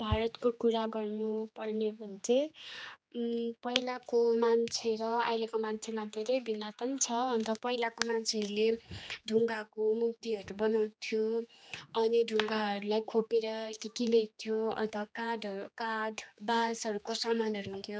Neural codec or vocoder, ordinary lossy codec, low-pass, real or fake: codec, 16 kHz, 2 kbps, X-Codec, HuBERT features, trained on general audio; none; none; fake